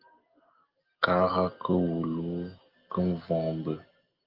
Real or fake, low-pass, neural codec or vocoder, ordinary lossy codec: real; 5.4 kHz; none; Opus, 32 kbps